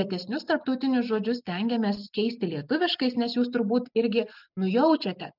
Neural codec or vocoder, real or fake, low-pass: none; real; 5.4 kHz